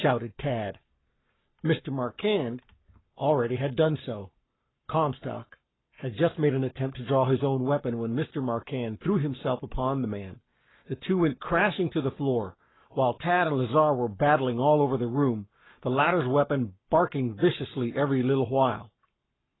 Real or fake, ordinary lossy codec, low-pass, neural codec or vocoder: fake; AAC, 16 kbps; 7.2 kHz; codec, 44.1 kHz, 7.8 kbps, Pupu-Codec